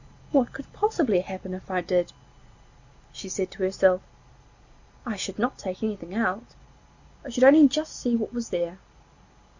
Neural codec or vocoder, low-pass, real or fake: none; 7.2 kHz; real